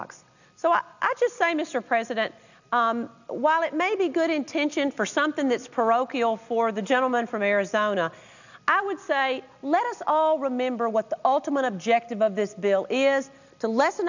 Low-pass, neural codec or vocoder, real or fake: 7.2 kHz; none; real